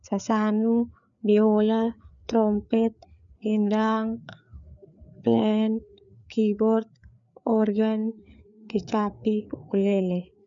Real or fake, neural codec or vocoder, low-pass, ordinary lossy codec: fake; codec, 16 kHz, 4 kbps, FreqCodec, larger model; 7.2 kHz; none